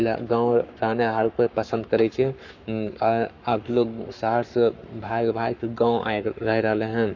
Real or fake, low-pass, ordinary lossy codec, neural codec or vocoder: fake; 7.2 kHz; none; codec, 44.1 kHz, 7.8 kbps, Pupu-Codec